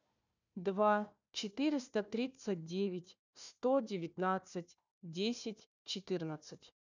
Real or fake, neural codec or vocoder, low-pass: fake; codec, 16 kHz, 1 kbps, FunCodec, trained on Chinese and English, 50 frames a second; 7.2 kHz